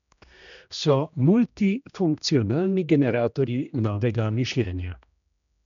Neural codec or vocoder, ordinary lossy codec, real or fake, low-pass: codec, 16 kHz, 1 kbps, X-Codec, HuBERT features, trained on general audio; none; fake; 7.2 kHz